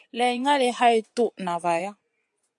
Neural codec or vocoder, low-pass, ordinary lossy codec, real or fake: vocoder, 24 kHz, 100 mel bands, Vocos; 10.8 kHz; AAC, 64 kbps; fake